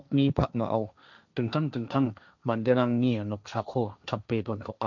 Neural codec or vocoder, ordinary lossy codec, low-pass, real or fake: codec, 16 kHz, 1.1 kbps, Voila-Tokenizer; none; 7.2 kHz; fake